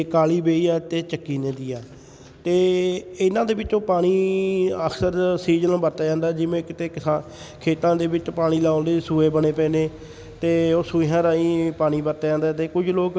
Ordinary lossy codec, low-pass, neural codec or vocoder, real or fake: none; none; none; real